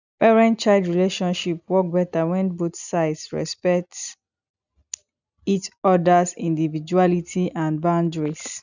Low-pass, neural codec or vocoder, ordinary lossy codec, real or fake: 7.2 kHz; none; none; real